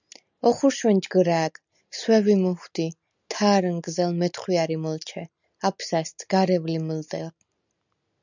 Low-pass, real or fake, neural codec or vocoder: 7.2 kHz; real; none